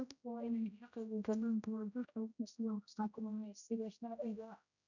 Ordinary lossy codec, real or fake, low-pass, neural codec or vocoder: none; fake; 7.2 kHz; codec, 16 kHz, 0.5 kbps, X-Codec, HuBERT features, trained on general audio